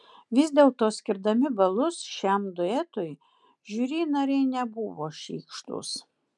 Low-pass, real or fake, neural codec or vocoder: 10.8 kHz; real; none